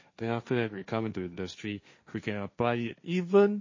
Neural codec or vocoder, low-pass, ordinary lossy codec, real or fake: codec, 16 kHz, 1.1 kbps, Voila-Tokenizer; 7.2 kHz; MP3, 32 kbps; fake